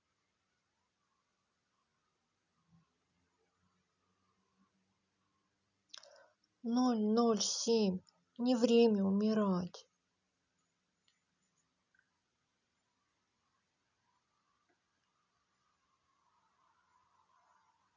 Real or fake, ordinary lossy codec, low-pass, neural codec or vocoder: real; none; 7.2 kHz; none